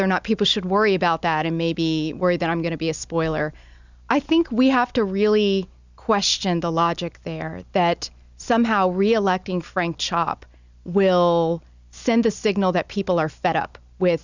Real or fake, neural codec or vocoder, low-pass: real; none; 7.2 kHz